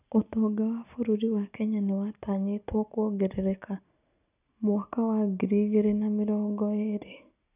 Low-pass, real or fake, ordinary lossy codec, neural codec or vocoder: 3.6 kHz; real; none; none